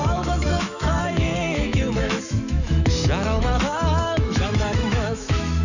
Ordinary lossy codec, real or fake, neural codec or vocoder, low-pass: none; fake; autoencoder, 48 kHz, 128 numbers a frame, DAC-VAE, trained on Japanese speech; 7.2 kHz